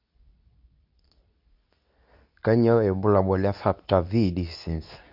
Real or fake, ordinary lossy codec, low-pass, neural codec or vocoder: fake; none; 5.4 kHz; codec, 24 kHz, 0.9 kbps, WavTokenizer, medium speech release version 2